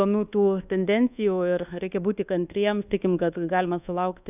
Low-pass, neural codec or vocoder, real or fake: 3.6 kHz; codec, 24 kHz, 1.2 kbps, DualCodec; fake